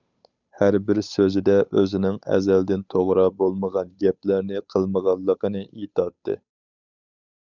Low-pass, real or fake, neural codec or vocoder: 7.2 kHz; fake; codec, 16 kHz, 8 kbps, FunCodec, trained on Chinese and English, 25 frames a second